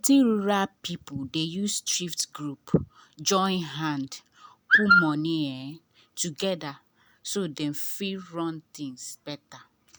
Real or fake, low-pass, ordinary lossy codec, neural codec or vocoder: real; none; none; none